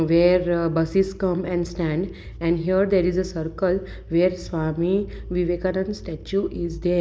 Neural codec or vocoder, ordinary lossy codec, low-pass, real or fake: none; none; none; real